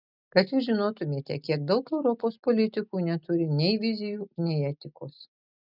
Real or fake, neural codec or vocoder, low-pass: real; none; 5.4 kHz